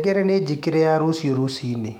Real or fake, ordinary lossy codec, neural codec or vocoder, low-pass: fake; MP3, 96 kbps; autoencoder, 48 kHz, 128 numbers a frame, DAC-VAE, trained on Japanese speech; 19.8 kHz